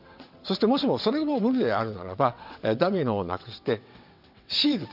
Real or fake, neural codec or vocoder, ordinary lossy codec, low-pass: fake; vocoder, 22.05 kHz, 80 mel bands, WaveNeXt; none; 5.4 kHz